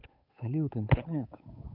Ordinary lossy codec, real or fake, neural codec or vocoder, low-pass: none; fake; codec, 16 kHz, 8 kbps, FunCodec, trained on Chinese and English, 25 frames a second; 5.4 kHz